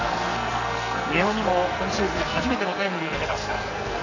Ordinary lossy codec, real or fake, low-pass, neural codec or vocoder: none; fake; 7.2 kHz; codec, 44.1 kHz, 2.6 kbps, SNAC